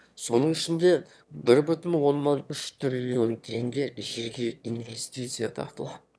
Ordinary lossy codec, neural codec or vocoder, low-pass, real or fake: none; autoencoder, 22.05 kHz, a latent of 192 numbers a frame, VITS, trained on one speaker; none; fake